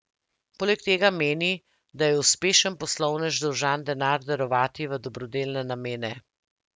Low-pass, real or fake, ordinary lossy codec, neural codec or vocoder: none; real; none; none